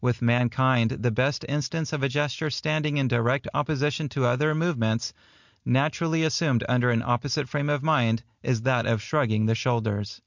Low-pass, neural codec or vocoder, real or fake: 7.2 kHz; none; real